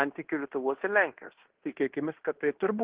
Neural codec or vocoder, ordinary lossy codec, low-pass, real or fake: codec, 16 kHz in and 24 kHz out, 0.9 kbps, LongCat-Audio-Codec, fine tuned four codebook decoder; Opus, 16 kbps; 3.6 kHz; fake